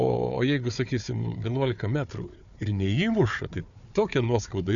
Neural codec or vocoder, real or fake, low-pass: codec, 16 kHz, 4 kbps, FunCodec, trained on Chinese and English, 50 frames a second; fake; 7.2 kHz